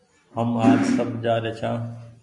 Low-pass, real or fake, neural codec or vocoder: 10.8 kHz; real; none